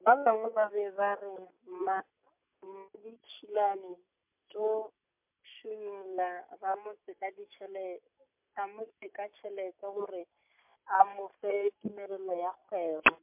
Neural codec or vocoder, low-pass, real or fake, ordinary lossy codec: none; 3.6 kHz; real; MP3, 32 kbps